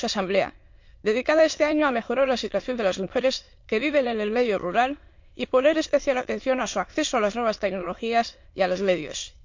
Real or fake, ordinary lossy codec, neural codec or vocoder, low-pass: fake; MP3, 48 kbps; autoencoder, 22.05 kHz, a latent of 192 numbers a frame, VITS, trained on many speakers; 7.2 kHz